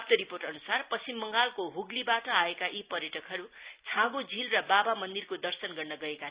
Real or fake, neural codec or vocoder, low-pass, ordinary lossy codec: real; none; 3.6 kHz; Opus, 64 kbps